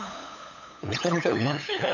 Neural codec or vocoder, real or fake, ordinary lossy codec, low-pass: codec, 16 kHz, 8 kbps, FunCodec, trained on LibriTTS, 25 frames a second; fake; none; 7.2 kHz